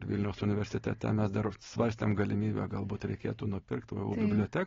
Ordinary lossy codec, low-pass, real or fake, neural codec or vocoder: AAC, 24 kbps; 7.2 kHz; real; none